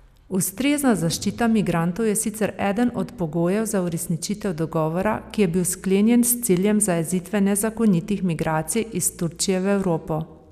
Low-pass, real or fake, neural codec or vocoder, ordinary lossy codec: 14.4 kHz; real; none; none